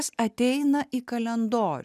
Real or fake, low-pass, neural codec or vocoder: real; 14.4 kHz; none